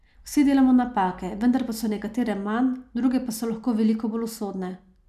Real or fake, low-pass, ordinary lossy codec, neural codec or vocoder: real; 14.4 kHz; none; none